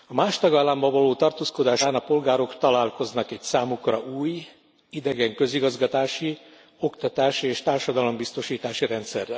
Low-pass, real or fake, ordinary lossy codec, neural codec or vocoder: none; real; none; none